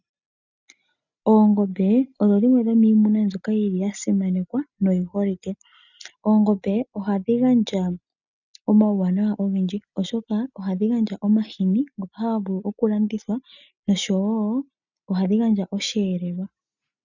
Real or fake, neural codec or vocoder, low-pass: real; none; 7.2 kHz